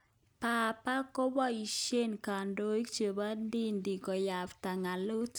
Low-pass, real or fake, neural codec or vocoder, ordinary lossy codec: none; real; none; none